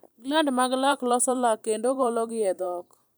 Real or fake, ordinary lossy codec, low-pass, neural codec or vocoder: fake; none; none; vocoder, 44.1 kHz, 128 mel bands every 512 samples, BigVGAN v2